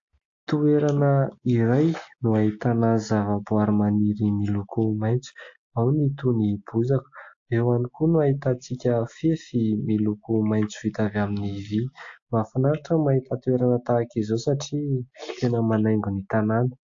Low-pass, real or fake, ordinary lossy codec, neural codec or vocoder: 7.2 kHz; real; AAC, 48 kbps; none